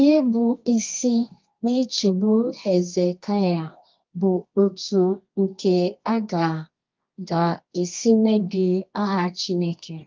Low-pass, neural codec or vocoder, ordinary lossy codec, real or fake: 7.2 kHz; codec, 24 kHz, 0.9 kbps, WavTokenizer, medium music audio release; Opus, 24 kbps; fake